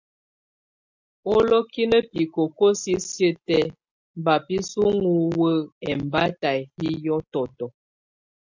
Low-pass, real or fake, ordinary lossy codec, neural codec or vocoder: 7.2 kHz; real; MP3, 64 kbps; none